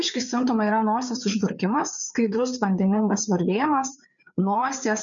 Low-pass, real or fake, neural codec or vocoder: 7.2 kHz; fake; codec, 16 kHz, 4 kbps, FreqCodec, larger model